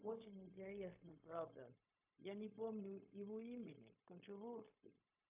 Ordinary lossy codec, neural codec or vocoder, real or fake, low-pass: AAC, 32 kbps; codec, 16 kHz, 0.4 kbps, LongCat-Audio-Codec; fake; 3.6 kHz